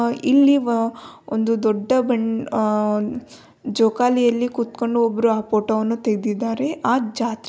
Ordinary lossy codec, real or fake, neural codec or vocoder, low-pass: none; real; none; none